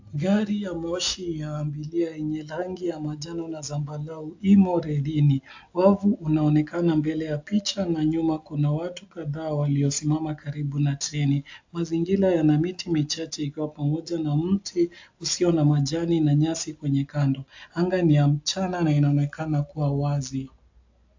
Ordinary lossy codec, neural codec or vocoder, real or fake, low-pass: AAC, 48 kbps; none; real; 7.2 kHz